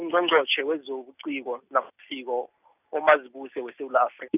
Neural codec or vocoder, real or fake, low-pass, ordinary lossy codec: none; real; 3.6 kHz; none